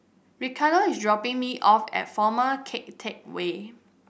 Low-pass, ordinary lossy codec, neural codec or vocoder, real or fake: none; none; none; real